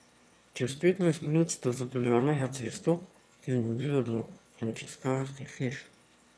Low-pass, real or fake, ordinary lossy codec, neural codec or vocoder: none; fake; none; autoencoder, 22.05 kHz, a latent of 192 numbers a frame, VITS, trained on one speaker